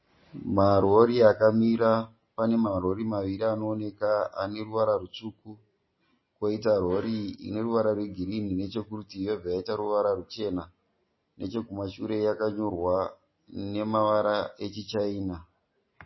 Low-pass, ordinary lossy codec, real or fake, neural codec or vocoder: 7.2 kHz; MP3, 24 kbps; real; none